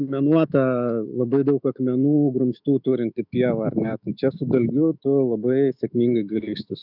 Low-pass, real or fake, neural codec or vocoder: 5.4 kHz; fake; autoencoder, 48 kHz, 128 numbers a frame, DAC-VAE, trained on Japanese speech